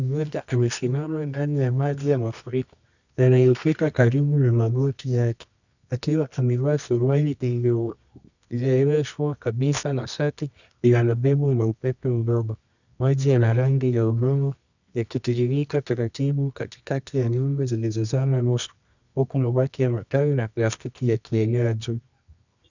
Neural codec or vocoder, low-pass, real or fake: codec, 24 kHz, 0.9 kbps, WavTokenizer, medium music audio release; 7.2 kHz; fake